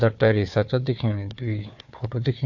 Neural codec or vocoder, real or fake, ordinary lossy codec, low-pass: codec, 16 kHz, 4 kbps, FunCodec, trained on Chinese and English, 50 frames a second; fake; MP3, 48 kbps; 7.2 kHz